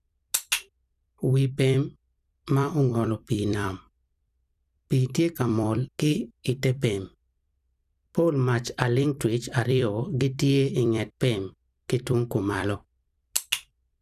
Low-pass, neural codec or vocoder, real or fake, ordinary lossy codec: 14.4 kHz; vocoder, 44.1 kHz, 128 mel bands every 256 samples, BigVGAN v2; fake; none